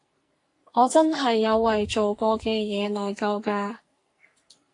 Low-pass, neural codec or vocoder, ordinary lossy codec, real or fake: 10.8 kHz; codec, 44.1 kHz, 2.6 kbps, SNAC; AAC, 48 kbps; fake